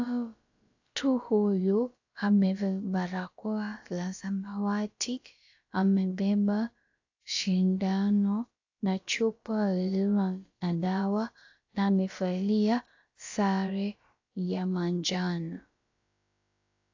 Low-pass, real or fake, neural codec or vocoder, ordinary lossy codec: 7.2 kHz; fake; codec, 16 kHz, about 1 kbps, DyCAST, with the encoder's durations; AAC, 48 kbps